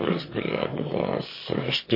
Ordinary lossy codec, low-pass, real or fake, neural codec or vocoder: MP3, 24 kbps; 5.4 kHz; fake; autoencoder, 22.05 kHz, a latent of 192 numbers a frame, VITS, trained on one speaker